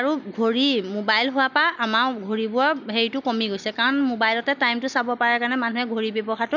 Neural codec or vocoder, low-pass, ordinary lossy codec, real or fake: none; 7.2 kHz; none; real